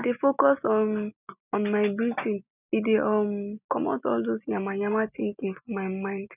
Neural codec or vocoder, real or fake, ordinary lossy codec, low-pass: none; real; none; 3.6 kHz